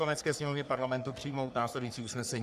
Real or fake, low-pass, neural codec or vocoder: fake; 14.4 kHz; codec, 44.1 kHz, 3.4 kbps, Pupu-Codec